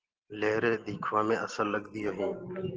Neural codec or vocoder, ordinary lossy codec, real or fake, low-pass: none; Opus, 16 kbps; real; 7.2 kHz